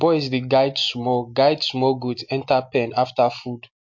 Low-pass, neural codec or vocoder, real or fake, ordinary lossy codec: 7.2 kHz; none; real; MP3, 48 kbps